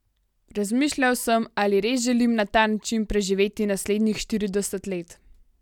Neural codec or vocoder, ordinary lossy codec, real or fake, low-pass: none; none; real; 19.8 kHz